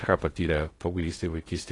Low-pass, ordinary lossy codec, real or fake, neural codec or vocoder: 10.8 kHz; AAC, 32 kbps; fake; codec, 16 kHz in and 24 kHz out, 0.6 kbps, FocalCodec, streaming, 2048 codes